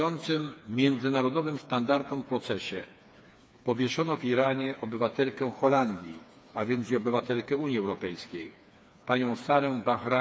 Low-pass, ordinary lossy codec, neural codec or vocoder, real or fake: none; none; codec, 16 kHz, 4 kbps, FreqCodec, smaller model; fake